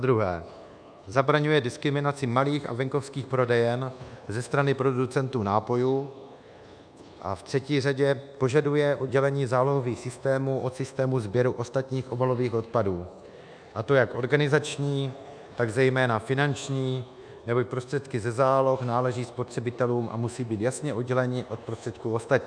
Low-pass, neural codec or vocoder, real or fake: 9.9 kHz; codec, 24 kHz, 1.2 kbps, DualCodec; fake